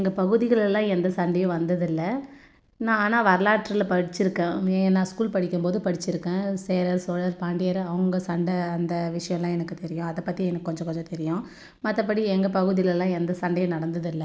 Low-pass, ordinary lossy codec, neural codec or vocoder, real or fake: none; none; none; real